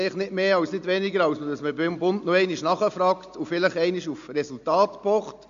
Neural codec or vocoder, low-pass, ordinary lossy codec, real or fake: none; 7.2 kHz; MP3, 64 kbps; real